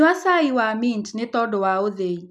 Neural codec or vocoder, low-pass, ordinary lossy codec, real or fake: none; none; none; real